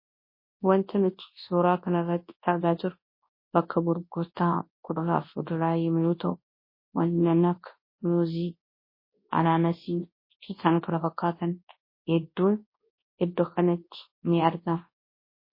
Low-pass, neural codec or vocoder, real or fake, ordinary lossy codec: 5.4 kHz; codec, 24 kHz, 0.9 kbps, WavTokenizer, large speech release; fake; MP3, 24 kbps